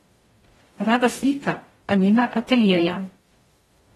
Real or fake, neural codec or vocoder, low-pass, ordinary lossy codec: fake; codec, 44.1 kHz, 0.9 kbps, DAC; 19.8 kHz; AAC, 32 kbps